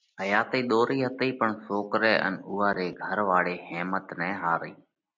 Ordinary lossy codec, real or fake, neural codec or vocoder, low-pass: MP3, 64 kbps; real; none; 7.2 kHz